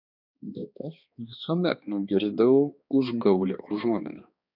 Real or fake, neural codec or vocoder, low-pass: fake; codec, 16 kHz, 2 kbps, X-Codec, HuBERT features, trained on balanced general audio; 5.4 kHz